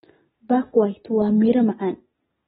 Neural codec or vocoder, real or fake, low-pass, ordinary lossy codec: none; real; 19.8 kHz; AAC, 16 kbps